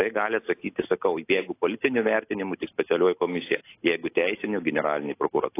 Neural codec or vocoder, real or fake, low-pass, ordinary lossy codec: none; real; 3.6 kHz; AAC, 24 kbps